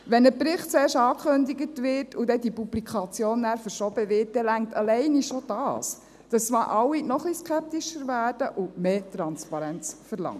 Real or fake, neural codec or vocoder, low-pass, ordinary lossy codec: real; none; 14.4 kHz; none